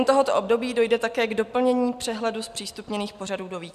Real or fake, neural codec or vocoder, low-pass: real; none; 14.4 kHz